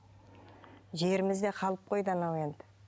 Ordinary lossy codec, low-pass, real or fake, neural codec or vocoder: none; none; real; none